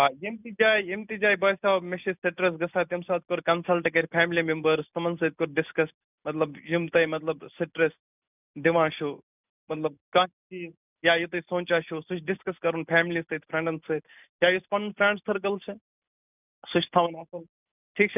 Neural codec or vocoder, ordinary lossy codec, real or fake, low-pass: none; none; real; 3.6 kHz